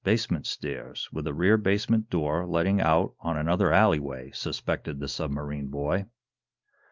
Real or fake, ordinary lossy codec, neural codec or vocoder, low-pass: real; Opus, 32 kbps; none; 7.2 kHz